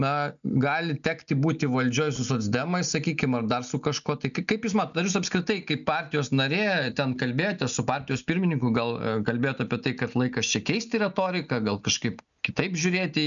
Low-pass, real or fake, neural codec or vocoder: 7.2 kHz; real; none